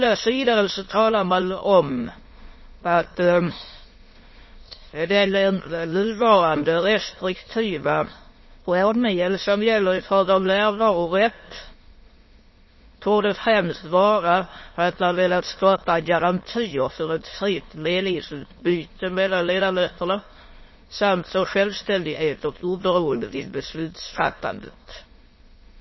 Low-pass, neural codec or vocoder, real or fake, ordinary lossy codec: 7.2 kHz; autoencoder, 22.05 kHz, a latent of 192 numbers a frame, VITS, trained on many speakers; fake; MP3, 24 kbps